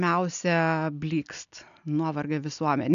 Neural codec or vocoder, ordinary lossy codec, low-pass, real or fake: none; MP3, 96 kbps; 7.2 kHz; real